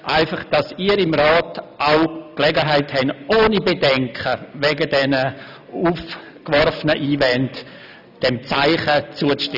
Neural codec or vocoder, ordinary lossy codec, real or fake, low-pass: none; none; real; 5.4 kHz